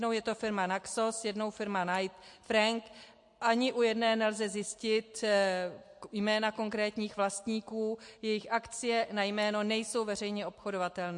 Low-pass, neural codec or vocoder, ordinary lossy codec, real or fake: 10.8 kHz; none; MP3, 48 kbps; real